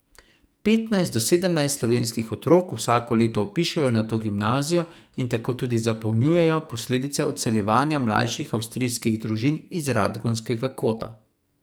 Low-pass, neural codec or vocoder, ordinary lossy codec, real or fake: none; codec, 44.1 kHz, 2.6 kbps, SNAC; none; fake